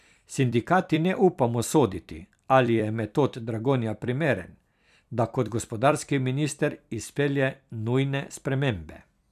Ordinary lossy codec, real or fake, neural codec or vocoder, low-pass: none; fake; vocoder, 44.1 kHz, 128 mel bands every 256 samples, BigVGAN v2; 14.4 kHz